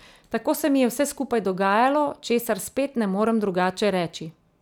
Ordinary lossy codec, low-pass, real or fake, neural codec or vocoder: none; 19.8 kHz; real; none